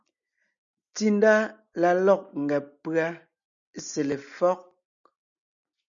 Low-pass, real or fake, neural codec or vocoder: 7.2 kHz; real; none